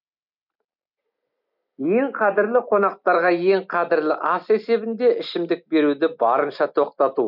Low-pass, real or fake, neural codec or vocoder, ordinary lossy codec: 5.4 kHz; real; none; none